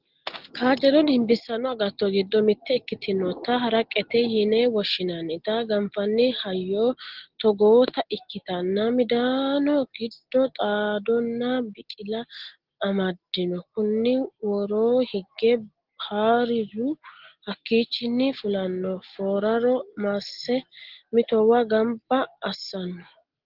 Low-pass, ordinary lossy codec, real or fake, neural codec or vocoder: 5.4 kHz; Opus, 16 kbps; real; none